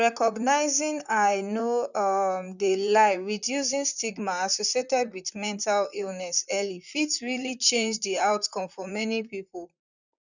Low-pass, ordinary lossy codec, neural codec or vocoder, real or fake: 7.2 kHz; none; vocoder, 44.1 kHz, 128 mel bands, Pupu-Vocoder; fake